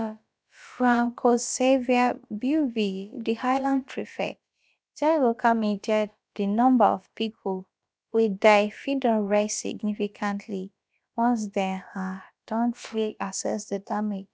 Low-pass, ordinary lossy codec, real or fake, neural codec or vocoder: none; none; fake; codec, 16 kHz, about 1 kbps, DyCAST, with the encoder's durations